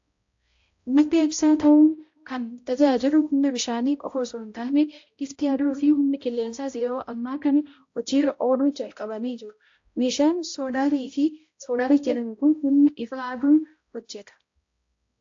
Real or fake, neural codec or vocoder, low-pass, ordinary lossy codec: fake; codec, 16 kHz, 0.5 kbps, X-Codec, HuBERT features, trained on balanced general audio; 7.2 kHz; AAC, 48 kbps